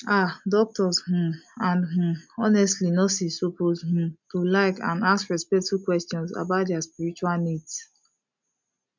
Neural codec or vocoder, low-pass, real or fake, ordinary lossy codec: none; 7.2 kHz; real; MP3, 64 kbps